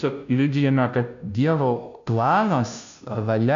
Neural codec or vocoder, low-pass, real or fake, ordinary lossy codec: codec, 16 kHz, 0.5 kbps, FunCodec, trained on Chinese and English, 25 frames a second; 7.2 kHz; fake; MP3, 96 kbps